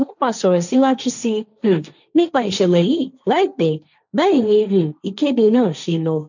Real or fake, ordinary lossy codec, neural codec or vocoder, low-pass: fake; none; codec, 16 kHz, 1.1 kbps, Voila-Tokenizer; 7.2 kHz